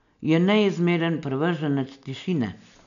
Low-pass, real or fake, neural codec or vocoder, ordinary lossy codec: 7.2 kHz; real; none; none